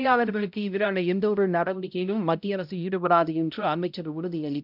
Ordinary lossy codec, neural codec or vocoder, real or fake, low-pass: none; codec, 16 kHz, 0.5 kbps, X-Codec, HuBERT features, trained on balanced general audio; fake; 5.4 kHz